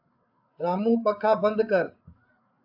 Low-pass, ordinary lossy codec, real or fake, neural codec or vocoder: 5.4 kHz; AAC, 48 kbps; fake; codec, 16 kHz, 8 kbps, FreqCodec, larger model